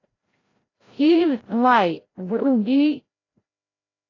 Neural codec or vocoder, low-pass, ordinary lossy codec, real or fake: codec, 16 kHz, 0.5 kbps, FreqCodec, larger model; 7.2 kHz; AAC, 32 kbps; fake